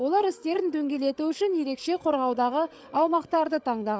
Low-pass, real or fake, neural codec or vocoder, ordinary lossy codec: none; fake; codec, 16 kHz, 8 kbps, FreqCodec, larger model; none